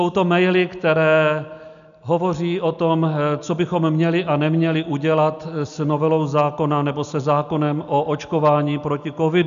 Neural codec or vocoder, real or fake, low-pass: none; real; 7.2 kHz